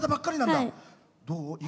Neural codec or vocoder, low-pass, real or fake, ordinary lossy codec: none; none; real; none